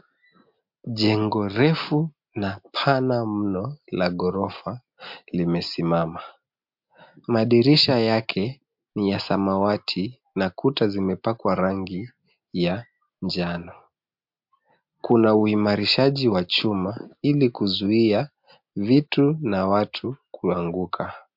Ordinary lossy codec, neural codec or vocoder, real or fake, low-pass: MP3, 48 kbps; none; real; 5.4 kHz